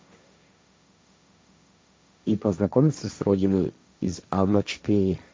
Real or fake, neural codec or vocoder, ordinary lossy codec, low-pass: fake; codec, 16 kHz, 1.1 kbps, Voila-Tokenizer; none; none